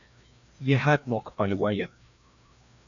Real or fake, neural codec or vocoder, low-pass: fake; codec, 16 kHz, 1 kbps, FreqCodec, larger model; 7.2 kHz